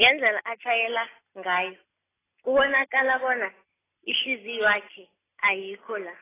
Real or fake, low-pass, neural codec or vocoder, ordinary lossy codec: real; 3.6 kHz; none; AAC, 16 kbps